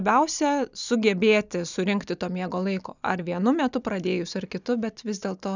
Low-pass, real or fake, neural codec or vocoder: 7.2 kHz; real; none